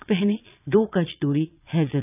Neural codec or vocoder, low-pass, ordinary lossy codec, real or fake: vocoder, 22.05 kHz, 80 mel bands, Vocos; 3.6 kHz; none; fake